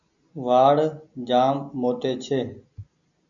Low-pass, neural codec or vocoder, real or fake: 7.2 kHz; none; real